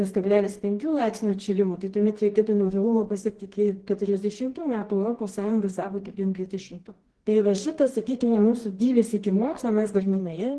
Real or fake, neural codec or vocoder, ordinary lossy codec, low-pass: fake; codec, 24 kHz, 0.9 kbps, WavTokenizer, medium music audio release; Opus, 16 kbps; 10.8 kHz